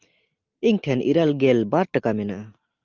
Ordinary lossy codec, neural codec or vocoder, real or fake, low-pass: Opus, 24 kbps; none; real; 7.2 kHz